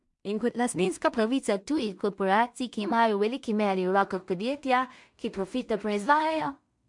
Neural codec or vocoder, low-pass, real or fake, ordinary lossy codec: codec, 16 kHz in and 24 kHz out, 0.4 kbps, LongCat-Audio-Codec, two codebook decoder; 10.8 kHz; fake; MP3, 64 kbps